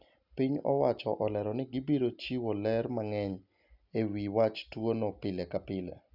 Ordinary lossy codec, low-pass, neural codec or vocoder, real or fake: none; 5.4 kHz; none; real